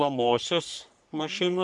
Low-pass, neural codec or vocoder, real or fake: 10.8 kHz; codec, 44.1 kHz, 3.4 kbps, Pupu-Codec; fake